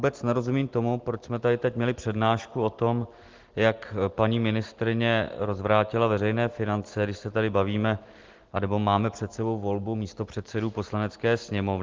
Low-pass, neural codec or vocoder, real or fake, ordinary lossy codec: 7.2 kHz; none; real; Opus, 16 kbps